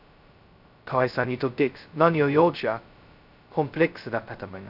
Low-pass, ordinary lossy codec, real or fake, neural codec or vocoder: 5.4 kHz; none; fake; codec, 16 kHz, 0.2 kbps, FocalCodec